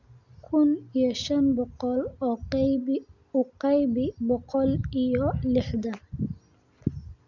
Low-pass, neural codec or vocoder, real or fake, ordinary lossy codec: 7.2 kHz; none; real; none